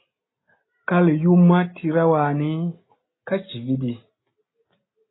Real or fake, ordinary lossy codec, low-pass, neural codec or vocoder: real; AAC, 16 kbps; 7.2 kHz; none